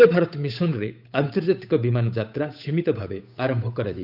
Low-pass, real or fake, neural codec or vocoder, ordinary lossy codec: 5.4 kHz; fake; codec, 16 kHz, 8 kbps, FunCodec, trained on Chinese and English, 25 frames a second; none